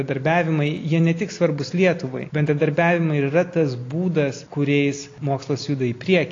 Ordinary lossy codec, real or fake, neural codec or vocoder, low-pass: AAC, 32 kbps; real; none; 7.2 kHz